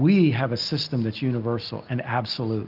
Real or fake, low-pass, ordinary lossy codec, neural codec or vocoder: real; 5.4 kHz; Opus, 32 kbps; none